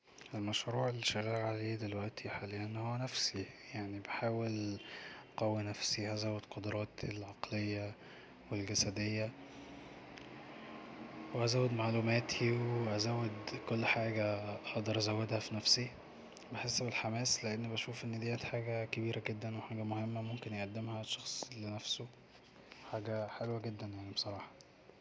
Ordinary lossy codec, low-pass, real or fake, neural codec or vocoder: none; none; real; none